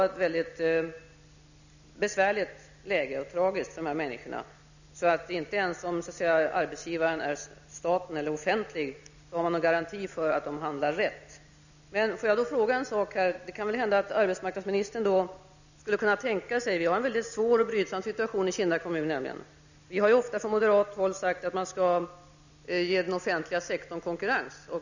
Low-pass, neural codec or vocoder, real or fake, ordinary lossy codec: 7.2 kHz; none; real; none